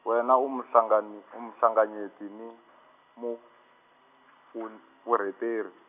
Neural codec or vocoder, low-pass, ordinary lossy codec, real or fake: none; 3.6 kHz; none; real